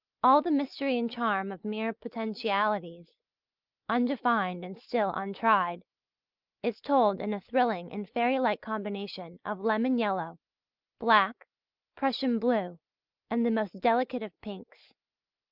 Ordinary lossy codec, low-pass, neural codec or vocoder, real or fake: Opus, 24 kbps; 5.4 kHz; none; real